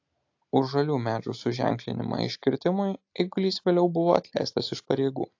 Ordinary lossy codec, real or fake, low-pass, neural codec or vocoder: AAC, 48 kbps; real; 7.2 kHz; none